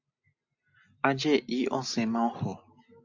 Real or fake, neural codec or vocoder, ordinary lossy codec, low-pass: real; none; AAC, 48 kbps; 7.2 kHz